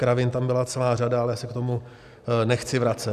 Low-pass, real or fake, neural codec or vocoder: 14.4 kHz; real; none